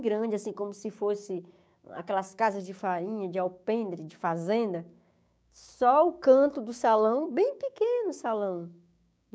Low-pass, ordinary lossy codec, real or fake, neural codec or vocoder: none; none; fake; codec, 16 kHz, 6 kbps, DAC